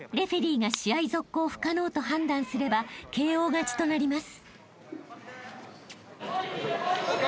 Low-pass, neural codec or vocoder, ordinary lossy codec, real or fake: none; none; none; real